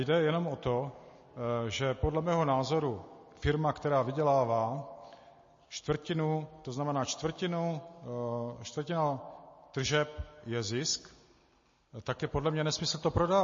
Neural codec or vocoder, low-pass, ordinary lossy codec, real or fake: none; 7.2 kHz; MP3, 32 kbps; real